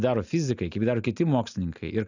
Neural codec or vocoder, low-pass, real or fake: none; 7.2 kHz; real